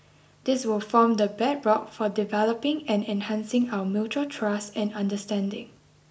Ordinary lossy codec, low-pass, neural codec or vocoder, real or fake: none; none; none; real